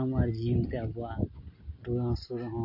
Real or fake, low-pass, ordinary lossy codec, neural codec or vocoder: real; 5.4 kHz; MP3, 32 kbps; none